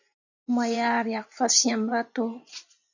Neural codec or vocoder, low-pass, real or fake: vocoder, 24 kHz, 100 mel bands, Vocos; 7.2 kHz; fake